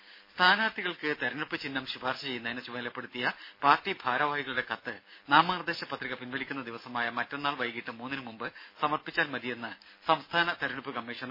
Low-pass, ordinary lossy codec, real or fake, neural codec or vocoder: 5.4 kHz; MP3, 24 kbps; real; none